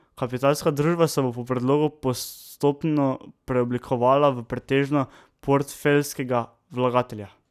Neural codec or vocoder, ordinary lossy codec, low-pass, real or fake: none; none; 14.4 kHz; real